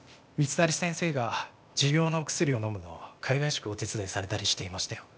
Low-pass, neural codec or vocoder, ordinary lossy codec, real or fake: none; codec, 16 kHz, 0.8 kbps, ZipCodec; none; fake